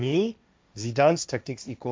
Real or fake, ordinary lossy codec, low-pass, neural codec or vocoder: fake; none; none; codec, 16 kHz, 1.1 kbps, Voila-Tokenizer